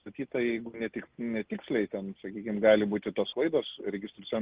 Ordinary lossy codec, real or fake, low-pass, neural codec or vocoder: Opus, 16 kbps; real; 3.6 kHz; none